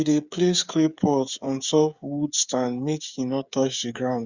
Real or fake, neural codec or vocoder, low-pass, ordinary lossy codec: fake; codec, 16 kHz, 8 kbps, FreqCodec, smaller model; 7.2 kHz; Opus, 64 kbps